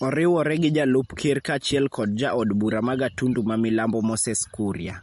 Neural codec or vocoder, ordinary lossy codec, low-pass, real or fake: none; MP3, 48 kbps; 19.8 kHz; real